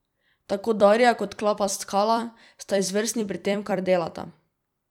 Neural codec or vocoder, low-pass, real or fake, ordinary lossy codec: vocoder, 44.1 kHz, 128 mel bands every 256 samples, BigVGAN v2; 19.8 kHz; fake; none